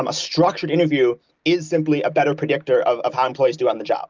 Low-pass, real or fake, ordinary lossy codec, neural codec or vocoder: 7.2 kHz; real; Opus, 24 kbps; none